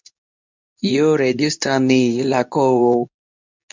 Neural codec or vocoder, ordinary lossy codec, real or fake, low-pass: codec, 24 kHz, 0.9 kbps, WavTokenizer, medium speech release version 2; MP3, 64 kbps; fake; 7.2 kHz